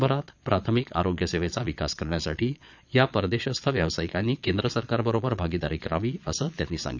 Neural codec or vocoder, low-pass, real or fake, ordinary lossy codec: vocoder, 22.05 kHz, 80 mel bands, Vocos; 7.2 kHz; fake; none